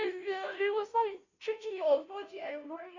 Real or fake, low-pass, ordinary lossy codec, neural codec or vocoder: fake; 7.2 kHz; AAC, 48 kbps; codec, 16 kHz, 0.5 kbps, FunCodec, trained on Chinese and English, 25 frames a second